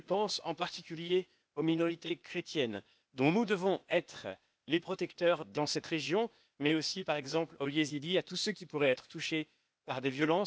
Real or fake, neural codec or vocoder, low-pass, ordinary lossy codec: fake; codec, 16 kHz, 0.8 kbps, ZipCodec; none; none